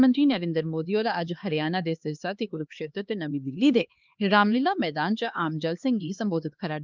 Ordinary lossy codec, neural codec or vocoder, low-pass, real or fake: Opus, 24 kbps; codec, 16 kHz, 2 kbps, X-Codec, HuBERT features, trained on LibriSpeech; 7.2 kHz; fake